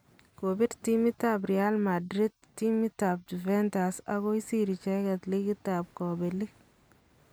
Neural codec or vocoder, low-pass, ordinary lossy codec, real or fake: none; none; none; real